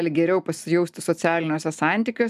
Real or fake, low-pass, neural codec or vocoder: real; 14.4 kHz; none